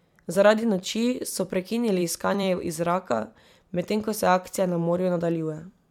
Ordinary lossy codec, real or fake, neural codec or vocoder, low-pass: MP3, 96 kbps; fake; vocoder, 44.1 kHz, 128 mel bands every 256 samples, BigVGAN v2; 19.8 kHz